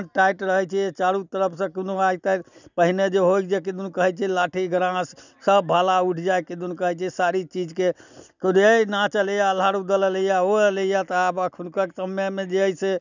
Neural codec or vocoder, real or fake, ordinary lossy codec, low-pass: none; real; none; 7.2 kHz